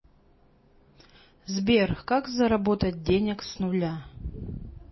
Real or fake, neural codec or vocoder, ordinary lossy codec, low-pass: real; none; MP3, 24 kbps; 7.2 kHz